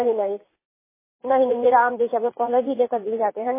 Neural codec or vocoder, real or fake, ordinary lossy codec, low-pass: vocoder, 44.1 kHz, 80 mel bands, Vocos; fake; MP3, 16 kbps; 3.6 kHz